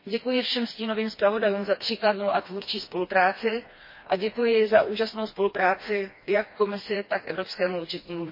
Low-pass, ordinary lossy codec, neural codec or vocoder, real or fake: 5.4 kHz; MP3, 24 kbps; codec, 16 kHz, 2 kbps, FreqCodec, smaller model; fake